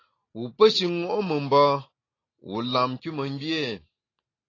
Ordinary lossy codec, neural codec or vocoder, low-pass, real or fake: AAC, 32 kbps; none; 7.2 kHz; real